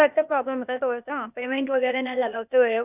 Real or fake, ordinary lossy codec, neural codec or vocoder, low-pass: fake; none; codec, 16 kHz, 0.8 kbps, ZipCodec; 3.6 kHz